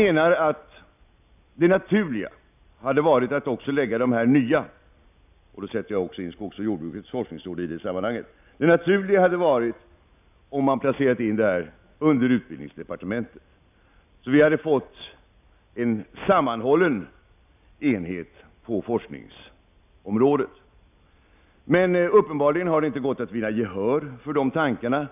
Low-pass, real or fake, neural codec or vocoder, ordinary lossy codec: 3.6 kHz; real; none; none